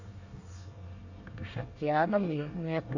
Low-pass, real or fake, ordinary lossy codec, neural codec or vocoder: 7.2 kHz; fake; Opus, 64 kbps; codec, 24 kHz, 1 kbps, SNAC